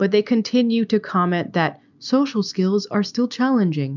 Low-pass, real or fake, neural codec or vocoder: 7.2 kHz; real; none